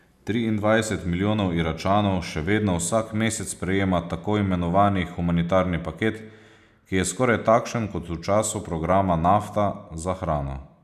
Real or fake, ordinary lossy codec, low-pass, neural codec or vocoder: real; none; 14.4 kHz; none